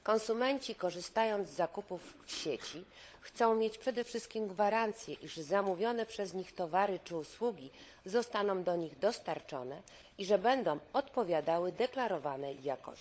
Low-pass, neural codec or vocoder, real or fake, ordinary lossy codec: none; codec, 16 kHz, 16 kbps, FunCodec, trained on LibriTTS, 50 frames a second; fake; none